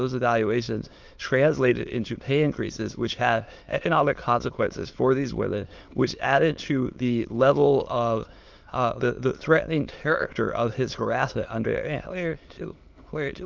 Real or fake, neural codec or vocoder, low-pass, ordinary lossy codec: fake; autoencoder, 22.05 kHz, a latent of 192 numbers a frame, VITS, trained on many speakers; 7.2 kHz; Opus, 32 kbps